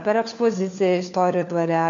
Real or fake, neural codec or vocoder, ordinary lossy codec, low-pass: fake; codec, 16 kHz, 4 kbps, FunCodec, trained on LibriTTS, 50 frames a second; MP3, 64 kbps; 7.2 kHz